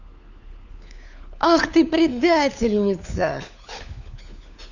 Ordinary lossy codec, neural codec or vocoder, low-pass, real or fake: none; codec, 16 kHz, 4 kbps, FunCodec, trained on LibriTTS, 50 frames a second; 7.2 kHz; fake